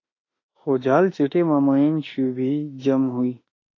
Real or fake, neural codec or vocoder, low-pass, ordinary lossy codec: fake; autoencoder, 48 kHz, 32 numbers a frame, DAC-VAE, trained on Japanese speech; 7.2 kHz; AAC, 32 kbps